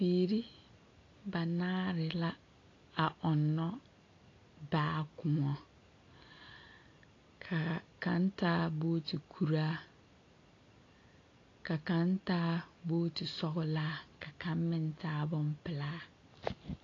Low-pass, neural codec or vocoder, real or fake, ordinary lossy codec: 7.2 kHz; none; real; AAC, 32 kbps